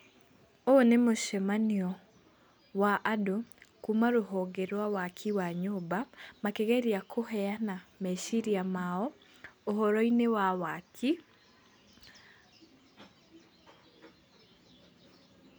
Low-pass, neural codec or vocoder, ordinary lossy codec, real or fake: none; none; none; real